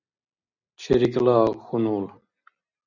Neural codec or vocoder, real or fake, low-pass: none; real; 7.2 kHz